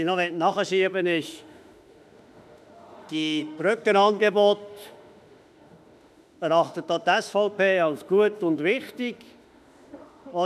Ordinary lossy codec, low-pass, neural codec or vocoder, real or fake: none; 14.4 kHz; autoencoder, 48 kHz, 32 numbers a frame, DAC-VAE, trained on Japanese speech; fake